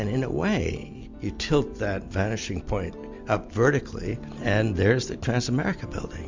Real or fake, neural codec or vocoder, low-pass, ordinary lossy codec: real; none; 7.2 kHz; MP3, 64 kbps